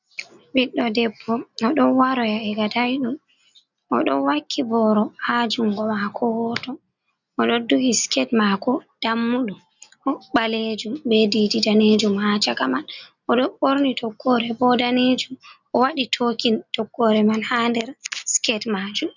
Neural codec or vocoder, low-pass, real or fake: none; 7.2 kHz; real